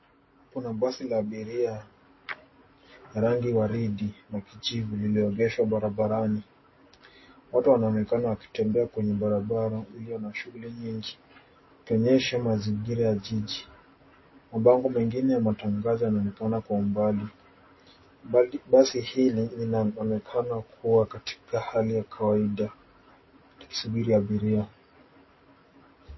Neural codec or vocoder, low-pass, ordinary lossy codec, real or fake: none; 7.2 kHz; MP3, 24 kbps; real